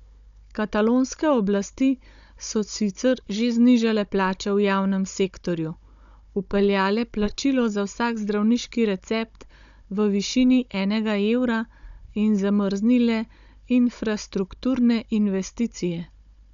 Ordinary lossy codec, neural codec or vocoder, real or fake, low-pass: none; codec, 16 kHz, 16 kbps, FunCodec, trained on Chinese and English, 50 frames a second; fake; 7.2 kHz